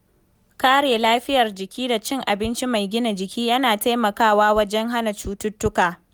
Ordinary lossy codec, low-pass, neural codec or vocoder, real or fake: none; none; none; real